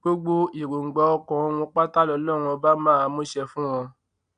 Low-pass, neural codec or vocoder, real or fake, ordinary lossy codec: 9.9 kHz; none; real; none